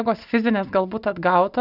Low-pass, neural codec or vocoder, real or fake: 5.4 kHz; none; real